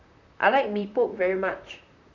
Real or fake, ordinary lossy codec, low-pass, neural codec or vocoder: fake; none; 7.2 kHz; vocoder, 44.1 kHz, 128 mel bands, Pupu-Vocoder